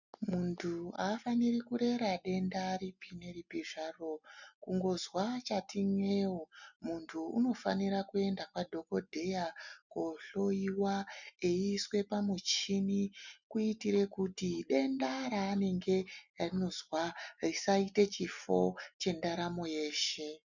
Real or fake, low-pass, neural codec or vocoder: real; 7.2 kHz; none